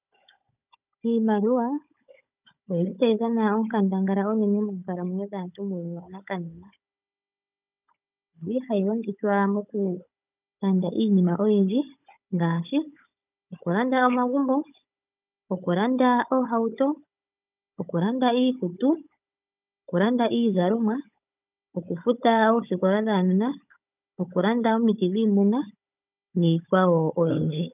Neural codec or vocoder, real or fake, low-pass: codec, 16 kHz, 16 kbps, FunCodec, trained on Chinese and English, 50 frames a second; fake; 3.6 kHz